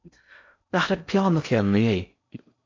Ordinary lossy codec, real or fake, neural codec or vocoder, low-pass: AAC, 48 kbps; fake; codec, 16 kHz in and 24 kHz out, 0.6 kbps, FocalCodec, streaming, 4096 codes; 7.2 kHz